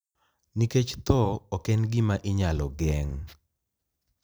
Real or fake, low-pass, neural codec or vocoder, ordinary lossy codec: fake; none; vocoder, 44.1 kHz, 128 mel bands every 256 samples, BigVGAN v2; none